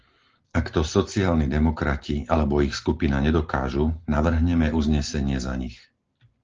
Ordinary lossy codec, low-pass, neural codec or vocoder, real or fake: Opus, 16 kbps; 7.2 kHz; none; real